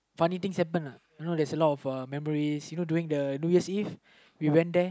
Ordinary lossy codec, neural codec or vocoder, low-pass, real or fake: none; none; none; real